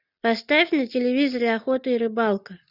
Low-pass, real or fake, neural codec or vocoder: 5.4 kHz; real; none